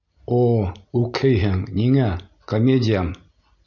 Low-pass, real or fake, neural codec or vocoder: 7.2 kHz; real; none